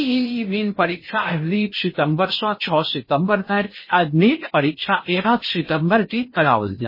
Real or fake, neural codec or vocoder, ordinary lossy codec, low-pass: fake; codec, 16 kHz in and 24 kHz out, 0.6 kbps, FocalCodec, streaming, 2048 codes; MP3, 24 kbps; 5.4 kHz